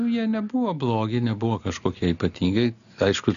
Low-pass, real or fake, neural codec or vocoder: 7.2 kHz; real; none